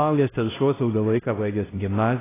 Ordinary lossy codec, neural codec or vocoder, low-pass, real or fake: AAC, 16 kbps; codec, 16 kHz, 0.5 kbps, X-Codec, HuBERT features, trained on LibriSpeech; 3.6 kHz; fake